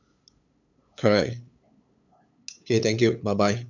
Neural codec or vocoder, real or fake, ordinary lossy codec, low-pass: codec, 16 kHz, 8 kbps, FunCodec, trained on LibriTTS, 25 frames a second; fake; none; 7.2 kHz